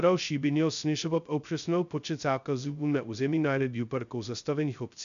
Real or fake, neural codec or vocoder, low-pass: fake; codec, 16 kHz, 0.2 kbps, FocalCodec; 7.2 kHz